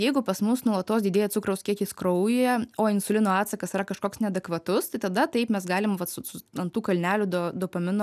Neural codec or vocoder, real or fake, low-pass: none; real; 14.4 kHz